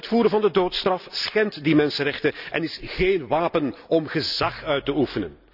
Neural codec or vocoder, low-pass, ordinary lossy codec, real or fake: none; 5.4 kHz; none; real